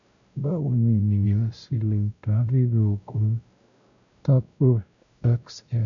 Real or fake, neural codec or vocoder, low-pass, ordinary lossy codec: fake; codec, 16 kHz, 0.5 kbps, X-Codec, WavLM features, trained on Multilingual LibriSpeech; 7.2 kHz; none